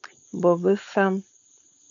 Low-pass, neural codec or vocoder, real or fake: 7.2 kHz; codec, 16 kHz, 4.8 kbps, FACodec; fake